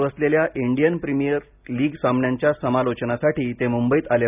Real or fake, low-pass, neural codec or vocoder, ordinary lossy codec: real; 3.6 kHz; none; none